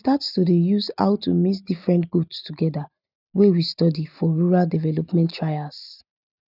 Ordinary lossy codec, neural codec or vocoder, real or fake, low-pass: none; none; real; 5.4 kHz